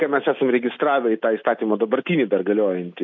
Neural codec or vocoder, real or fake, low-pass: none; real; 7.2 kHz